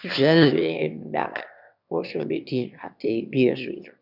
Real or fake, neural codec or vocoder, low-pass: fake; autoencoder, 22.05 kHz, a latent of 192 numbers a frame, VITS, trained on one speaker; 5.4 kHz